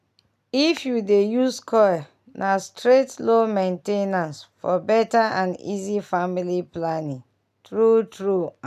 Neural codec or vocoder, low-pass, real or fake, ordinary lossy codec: none; 14.4 kHz; real; none